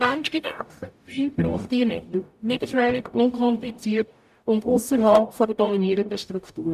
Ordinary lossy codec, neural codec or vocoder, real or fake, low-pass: none; codec, 44.1 kHz, 0.9 kbps, DAC; fake; 14.4 kHz